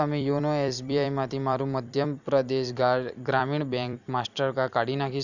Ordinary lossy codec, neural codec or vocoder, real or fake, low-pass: none; vocoder, 44.1 kHz, 128 mel bands every 256 samples, BigVGAN v2; fake; 7.2 kHz